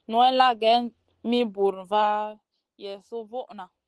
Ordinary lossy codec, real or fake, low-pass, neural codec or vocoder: Opus, 16 kbps; real; 10.8 kHz; none